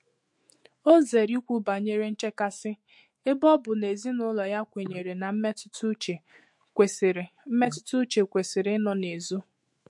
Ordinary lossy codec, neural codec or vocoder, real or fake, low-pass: MP3, 48 kbps; autoencoder, 48 kHz, 128 numbers a frame, DAC-VAE, trained on Japanese speech; fake; 10.8 kHz